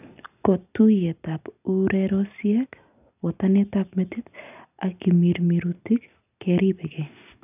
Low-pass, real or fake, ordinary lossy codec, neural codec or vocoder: 3.6 kHz; real; none; none